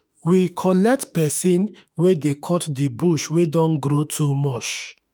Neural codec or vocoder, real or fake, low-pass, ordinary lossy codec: autoencoder, 48 kHz, 32 numbers a frame, DAC-VAE, trained on Japanese speech; fake; none; none